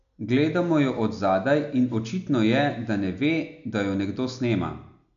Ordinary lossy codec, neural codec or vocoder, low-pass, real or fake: none; none; 7.2 kHz; real